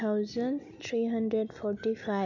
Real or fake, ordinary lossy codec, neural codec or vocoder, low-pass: real; none; none; 7.2 kHz